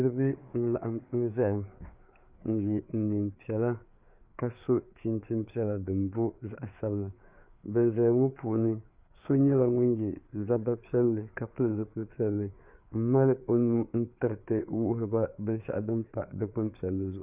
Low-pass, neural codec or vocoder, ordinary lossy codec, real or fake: 3.6 kHz; codec, 16 kHz, 4 kbps, FreqCodec, larger model; Opus, 64 kbps; fake